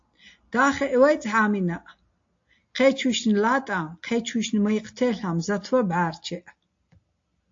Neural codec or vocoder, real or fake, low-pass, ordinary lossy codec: none; real; 7.2 kHz; AAC, 48 kbps